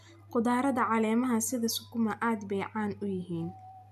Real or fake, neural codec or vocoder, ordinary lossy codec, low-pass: real; none; none; 14.4 kHz